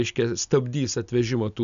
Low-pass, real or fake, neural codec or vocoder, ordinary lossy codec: 7.2 kHz; real; none; MP3, 96 kbps